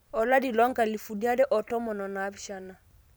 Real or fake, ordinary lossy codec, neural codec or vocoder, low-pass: fake; none; vocoder, 44.1 kHz, 128 mel bands every 512 samples, BigVGAN v2; none